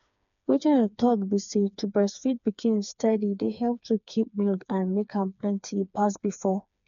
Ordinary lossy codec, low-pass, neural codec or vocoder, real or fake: none; 7.2 kHz; codec, 16 kHz, 4 kbps, FreqCodec, smaller model; fake